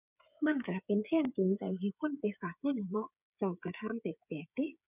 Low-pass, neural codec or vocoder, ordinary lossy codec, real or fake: 3.6 kHz; codec, 16 kHz, 8 kbps, FreqCodec, smaller model; none; fake